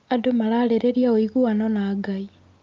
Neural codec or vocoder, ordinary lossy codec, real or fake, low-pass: none; Opus, 24 kbps; real; 7.2 kHz